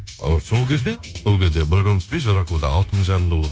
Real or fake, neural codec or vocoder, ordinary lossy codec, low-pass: fake; codec, 16 kHz, 0.9 kbps, LongCat-Audio-Codec; none; none